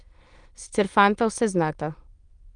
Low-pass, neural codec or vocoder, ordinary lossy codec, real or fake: 9.9 kHz; autoencoder, 22.05 kHz, a latent of 192 numbers a frame, VITS, trained on many speakers; Opus, 32 kbps; fake